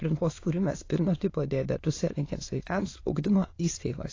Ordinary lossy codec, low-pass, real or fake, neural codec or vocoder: AAC, 32 kbps; 7.2 kHz; fake; autoencoder, 22.05 kHz, a latent of 192 numbers a frame, VITS, trained on many speakers